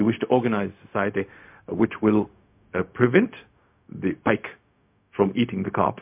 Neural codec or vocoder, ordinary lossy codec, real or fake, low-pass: codec, 16 kHz, 0.4 kbps, LongCat-Audio-Codec; MP3, 32 kbps; fake; 3.6 kHz